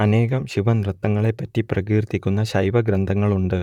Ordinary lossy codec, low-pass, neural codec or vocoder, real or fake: none; 19.8 kHz; vocoder, 44.1 kHz, 128 mel bands, Pupu-Vocoder; fake